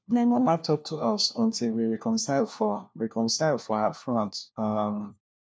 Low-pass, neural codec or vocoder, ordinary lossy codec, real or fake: none; codec, 16 kHz, 1 kbps, FunCodec, trained on LibriTTS, 50 frames a second; none; fake